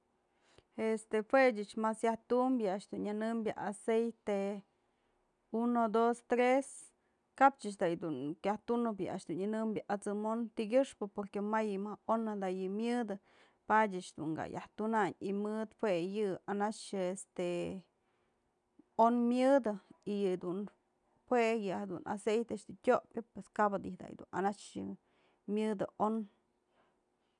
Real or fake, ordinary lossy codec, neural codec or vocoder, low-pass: real; none; none; 9.9 kHz